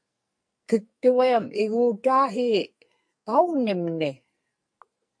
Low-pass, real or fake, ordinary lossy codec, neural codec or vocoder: 9.9 kHz; fake; MP3, 48 kbps; codec, 44.1 kHz, 2.6 kbps, SNAC